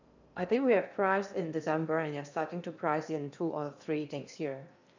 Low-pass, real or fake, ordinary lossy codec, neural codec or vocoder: 7.2 kHz; fake; none; codec, 16 kHz in and 24 kHz out, 0.8 kbps, FocalCodec, streaming, 65536 codes